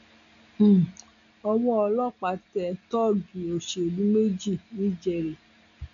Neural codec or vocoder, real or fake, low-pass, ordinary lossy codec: none; real; 7.2 kHz; none